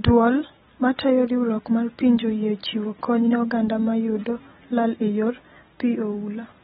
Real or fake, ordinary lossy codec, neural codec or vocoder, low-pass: real; AAC, 16 kbps; none; 10.8 kHz